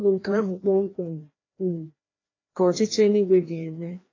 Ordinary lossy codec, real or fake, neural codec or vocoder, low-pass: AAC, 32 kbps; fake; codec, 16 kHz, 1 kbps, FreqCodec, larger model; 7.2 kHz